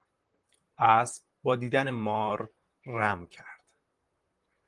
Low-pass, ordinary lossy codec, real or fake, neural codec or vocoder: 10.8 kHz; Opus, 24 kbps; fake; vocoder, 44.1 kHz, 128 mel bands, Pupu-Vocoder